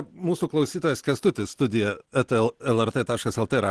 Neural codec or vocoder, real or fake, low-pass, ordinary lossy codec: none; real; 10.8 kHz; Opus, 16 kbps